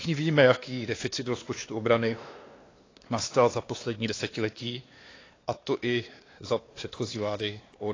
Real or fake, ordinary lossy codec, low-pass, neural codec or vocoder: fake; AAC, 32 kbps; 7.2 kHz; codec, 16 kHz, 2 kbps, X-Codec, WavLM features, trained on Multilingual LibriSpeech